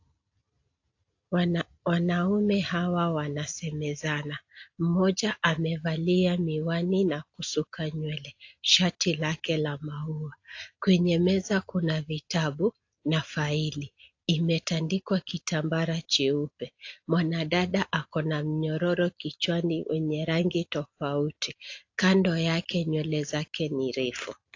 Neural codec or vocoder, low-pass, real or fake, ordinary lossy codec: none; 7.2 kHz; real; AAC, 48 kbps